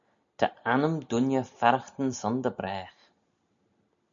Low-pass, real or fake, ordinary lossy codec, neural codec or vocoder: 7.2 kHz; real; MP3, 96 kbps; none